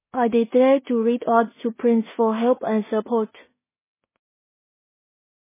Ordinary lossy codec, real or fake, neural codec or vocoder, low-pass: MP3, 16 kbps; fake; codec, 16 kHz in and 24 kHz out, 0.4 kbps, LongCat-Audio-Codec, two codebook decoder; 3.6 kHz